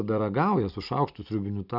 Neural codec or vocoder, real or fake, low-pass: none; real; 5.4 kHz